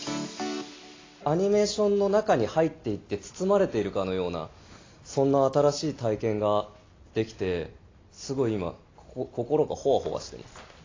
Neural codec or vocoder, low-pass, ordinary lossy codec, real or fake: none; 7.2 kHz; AAC, 32 kbps; real